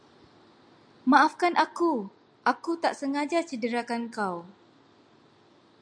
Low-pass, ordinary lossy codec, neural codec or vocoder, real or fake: 9.9 kHz; MP3, 64 kbps; none; real